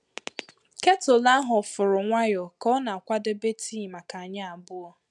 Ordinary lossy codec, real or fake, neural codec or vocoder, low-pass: none; real; none; 10.8 kHz